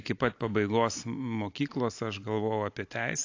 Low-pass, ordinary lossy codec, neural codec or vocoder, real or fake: 7.2 kHz; AAC, 48 kbps; none; real